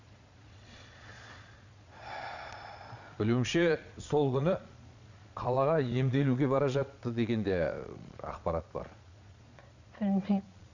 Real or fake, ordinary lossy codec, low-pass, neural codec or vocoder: fake; none; 7.2 kHz; vocoder, 22.05 kHz, 80 mel bands, Vocos